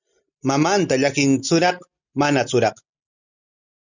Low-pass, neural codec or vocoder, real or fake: 7.2 kHz; none; real